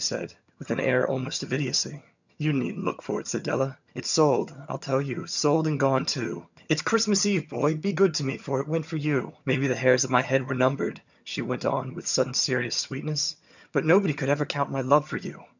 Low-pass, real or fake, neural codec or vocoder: 7.2 kHz; fake; vocoder, 22.05 kHz, 80 mel bands, HiFi-GAN